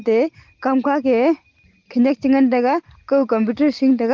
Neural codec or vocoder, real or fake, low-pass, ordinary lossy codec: none; real; 7.2 kHz; Opus, 16 kbps